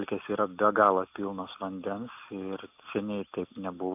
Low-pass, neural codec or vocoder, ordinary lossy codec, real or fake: 3.6 kHz; none; AAC, 32 kbps; real